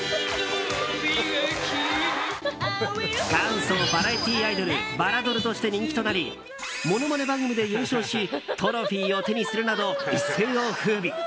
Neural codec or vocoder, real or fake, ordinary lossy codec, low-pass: none; real; none; none